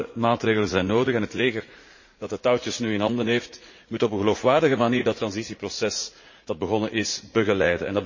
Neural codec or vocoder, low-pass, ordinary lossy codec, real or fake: vocoder, 44.1 kHz, 80 mel bands, Vocos; 7.2 kHz; MP3, 32 kbps; fake